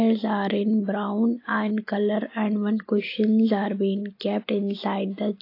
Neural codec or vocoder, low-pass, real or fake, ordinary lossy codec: none; 5.4 kHz; real; AAC, 32 kbps